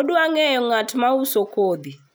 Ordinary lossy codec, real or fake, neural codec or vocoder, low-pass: none; real; none; none